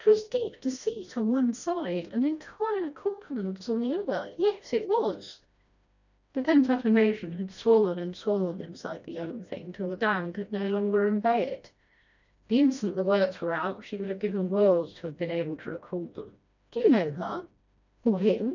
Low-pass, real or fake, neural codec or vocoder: 7.2 kHz; fake; codec, 16 kHz, 1 kbps, FreqCodec, smaller model